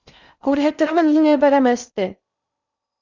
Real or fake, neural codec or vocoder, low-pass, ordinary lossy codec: fake; codec, 16 kHz in and 24 kHz out, 0.6 kbps, FocalCodec, streaming, 2048 codes; 7.2 kHz; Opus, 64 kbps